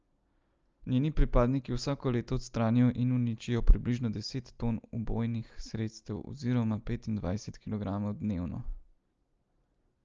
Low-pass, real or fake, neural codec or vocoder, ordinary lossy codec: 7.2 kHz; real; none; Opus, 24 kbps